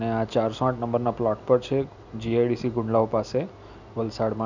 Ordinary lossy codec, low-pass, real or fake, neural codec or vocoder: none; 7.2 kHz; real; none